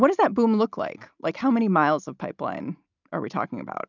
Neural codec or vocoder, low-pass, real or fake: none; 7.2 kHz; real